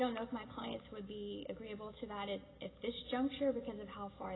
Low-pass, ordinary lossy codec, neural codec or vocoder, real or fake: 7.2 kHz; AAC, 16 kbps; none; real